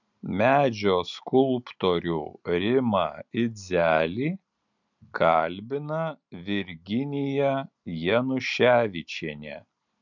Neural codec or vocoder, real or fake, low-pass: none; real; 7.2 kHz